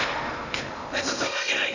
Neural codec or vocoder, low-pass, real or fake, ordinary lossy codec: codec, 16 kHz in and 24 kHz out, 0.8 kbps, FocalCodec, streaming, 65536 codes; 7.2 kHz; fake; none